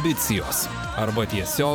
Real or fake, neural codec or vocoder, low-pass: fake; vocoder, 44.1 kHz, 128 mel bands every 512 samples, BigVGAN v2; 19.8 kHz